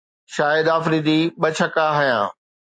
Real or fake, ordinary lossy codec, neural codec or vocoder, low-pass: real; MP3, 48 kbps; none; 9.9 kHz